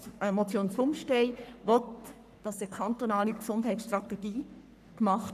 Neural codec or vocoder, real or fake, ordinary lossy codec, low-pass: codec, 44.1 kHz, 3.4 kbps, Pupu-Codec; fake; none; 14.4 kHz